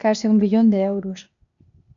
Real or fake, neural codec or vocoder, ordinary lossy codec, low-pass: fake; codec, 16 kHz, 0.8 kbps, ZipCodec; MP3, 96 kbps; 7.2 kHz